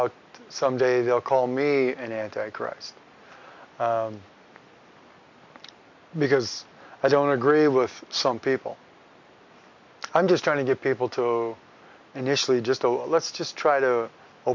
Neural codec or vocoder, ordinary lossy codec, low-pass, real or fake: none; MP3, 64 kbps; 7.2 kHz; real